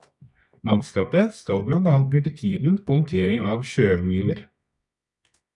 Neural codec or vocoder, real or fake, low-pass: codec, 24 kHz, 0.9 kbps, WavTokenizer, medium music audio release; fake; 10.8 kHz